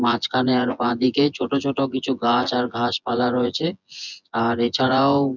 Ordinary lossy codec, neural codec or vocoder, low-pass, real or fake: none; vocoder, 24 kHz, 100 mel bands, Vocos; 7.2 kHz; fake